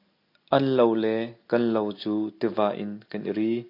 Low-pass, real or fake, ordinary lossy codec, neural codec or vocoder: 5.4 kHz; real; AAC, 32 kbps; none